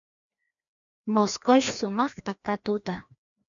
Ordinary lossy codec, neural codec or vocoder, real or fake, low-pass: MP3, 96 kbps; codec, 16 kHz, 1 kbps, FreqCodec, larger model; fake; 7.2 kHz